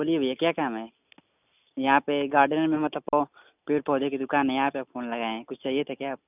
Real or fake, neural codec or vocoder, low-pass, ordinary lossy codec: real; none; 3.6 kHz; none